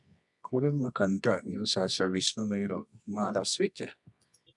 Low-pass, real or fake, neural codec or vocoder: 10.8 kHz; fake; codec, 24 kHz, 0.9 kbps, WavTokenizer, medium music audio release